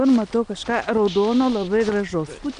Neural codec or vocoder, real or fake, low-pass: none; real; 9.9 kHz